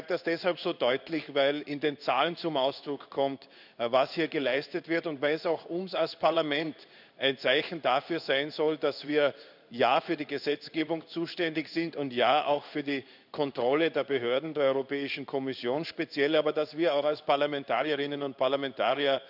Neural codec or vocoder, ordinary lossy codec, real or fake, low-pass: codec, 16 kHz in and 24 kHz out, 1 kbps, XY-Tokenizer; none; fake; 5.4 kHz